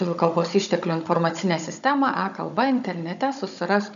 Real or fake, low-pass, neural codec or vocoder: fake; 7.2 kHz; codec, 16 kHz, 16 kbps, FunCodec, trained on Chinese and English, 50 frames a second